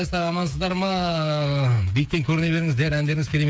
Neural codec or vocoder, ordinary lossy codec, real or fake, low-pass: codec, 16 kHz, 8 kbps, FreqCodec, smaller model; none; fake; none